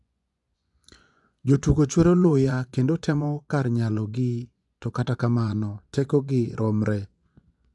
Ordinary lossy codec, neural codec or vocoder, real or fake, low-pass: none; vocoder, 24 kHz, 100 mel bands, Vocos; fake; 10.8 kHz